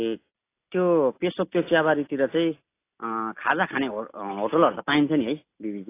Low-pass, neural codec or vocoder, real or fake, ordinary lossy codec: 3.6 kHz; none; real; AAC, 24 kbps